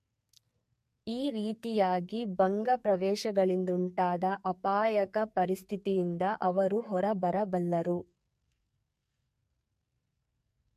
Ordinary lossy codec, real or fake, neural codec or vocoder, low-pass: MP3, 64 kbps; fake; codec, 44.1 kHz, 2.6 kbps, SNAC; 14.4 kHz